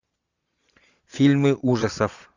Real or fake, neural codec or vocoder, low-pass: fake; vocoder, 22.05 kHz, 80 mel bands, Vocos; 7.2 kHz